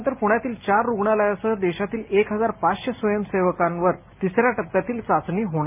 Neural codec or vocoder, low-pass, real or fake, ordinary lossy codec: none; 3.6 kHz; real; none